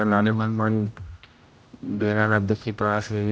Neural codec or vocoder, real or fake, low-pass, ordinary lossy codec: codec, 16 kHz, 0.5 kbps, X-Codec, HuBERT features, trained on general audio; fake; none; none